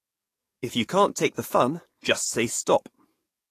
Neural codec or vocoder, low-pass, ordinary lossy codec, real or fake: codec, 44.1 kHz, 7.8 kbps, DAC; 14.4 kHz; AAC, 48 kbps; fake